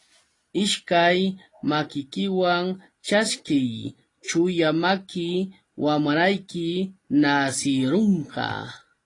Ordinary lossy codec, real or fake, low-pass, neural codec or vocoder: AAC, 32 kbps; real; 10.8 kHz; none